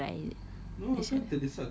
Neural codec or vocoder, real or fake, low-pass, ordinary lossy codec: none; real; none; none